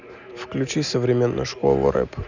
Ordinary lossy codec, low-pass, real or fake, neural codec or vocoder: none; 7.2 kHz; real; none